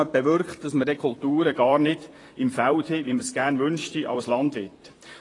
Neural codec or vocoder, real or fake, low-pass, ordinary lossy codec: vocoder, 44.1 kHz, 128 mel bands, Pupu-Vocoder; fake; 10.8 kHz; AAC, 32 kbps